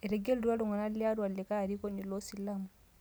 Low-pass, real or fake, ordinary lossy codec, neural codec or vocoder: none; real; none; none